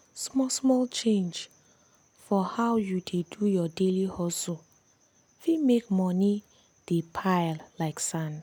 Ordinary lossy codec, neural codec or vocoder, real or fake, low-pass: none; none; real; 19.8 kHz